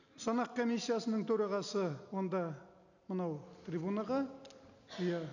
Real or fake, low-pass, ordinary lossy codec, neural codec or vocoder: real; 7.2 kHz; none; none